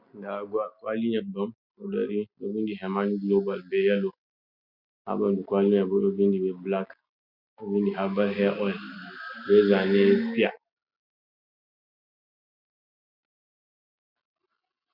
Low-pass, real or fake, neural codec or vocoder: 5.4 kHz; real; none